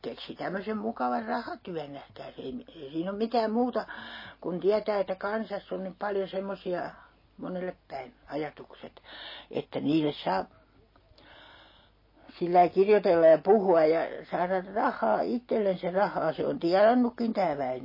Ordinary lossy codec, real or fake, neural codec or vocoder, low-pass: MP3, 24 kbps; real; none; 5.4 kHz